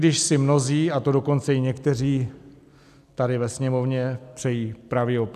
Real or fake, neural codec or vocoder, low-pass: real; none; 14.4 kHz